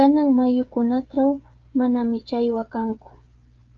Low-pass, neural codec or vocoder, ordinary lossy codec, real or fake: 7.2 kHz; codec, 16 kHz, 8 kbps, FreqCodec, smaller model; Opus, 32 kbps; fake